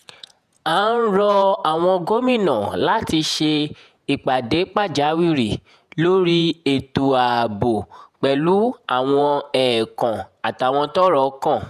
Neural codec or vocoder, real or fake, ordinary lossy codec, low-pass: vocoder, 48 kHz, 128 mel bands, Vocos; fake; none; 14.4 kHz